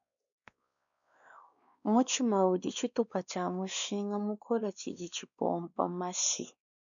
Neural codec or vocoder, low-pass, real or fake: codec, 16 kHz, 2 kbps, X-Codec, WavLM features, trained on Multilingual LibriSpeech; 7.2 kHz; fake